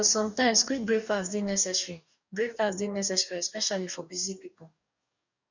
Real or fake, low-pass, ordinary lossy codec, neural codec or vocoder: fake; 7.2 kHz; none; codec, 44.1 kHz, 2.6 kbps, DAC